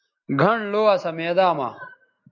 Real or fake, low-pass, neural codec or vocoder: real; 7.2 kHz; none